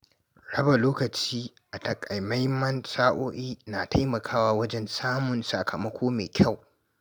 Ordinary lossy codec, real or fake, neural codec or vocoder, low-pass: none; real; none; none